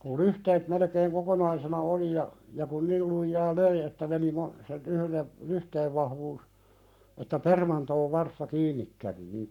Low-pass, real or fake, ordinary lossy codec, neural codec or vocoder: 19.8 kHz; fake; none; codec, 44.1 kHz, 7.8 kbps, Pupu-Codec